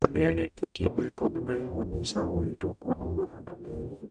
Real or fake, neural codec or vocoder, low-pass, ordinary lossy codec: fake; codec, 44.1 kHz, 0.9 kbps, DAC; 9.9 kHz; none